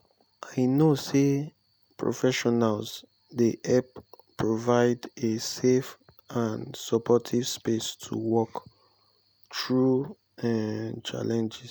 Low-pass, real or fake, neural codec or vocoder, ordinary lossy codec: none; real; none; none